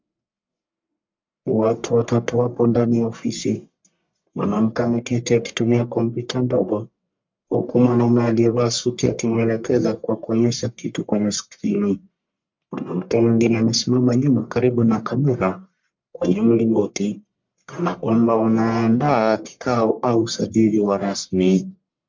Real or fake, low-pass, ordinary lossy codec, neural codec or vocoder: fake; 7.2 kHz; MP3, 64 kbps; codec, 44.1 kHz, 1.7 kbps, Pupu-Codec